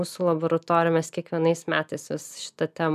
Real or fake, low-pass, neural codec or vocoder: real; 14.4 kHz; none